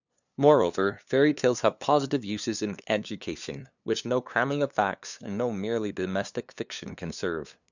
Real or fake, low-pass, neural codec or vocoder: fake; 7.2 kHz; codec, 16 kHz, 2 kbps, FunCodec, trained on LibriTTS, 25 frames a second